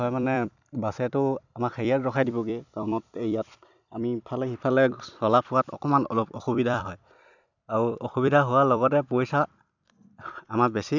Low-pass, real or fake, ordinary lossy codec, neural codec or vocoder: 7.2 kHz; fake; none; vocoder, 44.1 kHz, 128 mel bands every 256 samples, BigVGAN v2